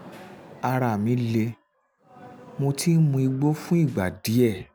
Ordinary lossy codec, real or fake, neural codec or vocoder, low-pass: none; real; none; none